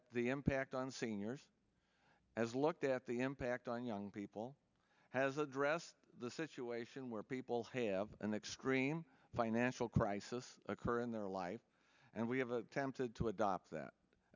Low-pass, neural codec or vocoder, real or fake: 7.2 kHz; none; real